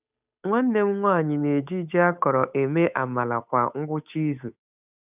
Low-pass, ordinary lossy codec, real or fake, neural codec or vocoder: 3.6 kHz; none; fake; codec, 16 kHz, 8 kbps, FunCodec, trained on Chinese and English, 25 frames a second